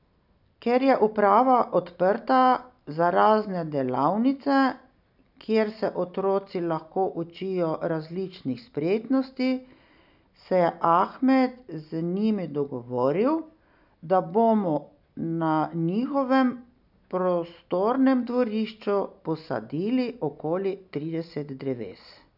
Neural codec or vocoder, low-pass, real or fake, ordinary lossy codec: none; 5.4 kHz; real; none